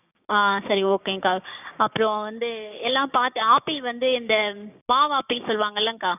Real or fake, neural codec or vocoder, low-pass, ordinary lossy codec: fake; codec, 16 kHz, 6 kbps, DAC; 3.6 kHz; none